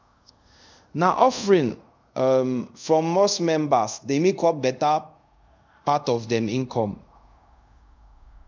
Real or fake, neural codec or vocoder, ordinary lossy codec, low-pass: fake; codec, 24 kHz, 0.5 kbps, DualCodec; MP3, 64 kbps; 7.2 kHz